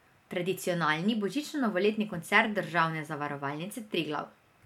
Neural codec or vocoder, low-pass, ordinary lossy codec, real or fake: none; 19.8 kHz; MP3, 96 kbps; real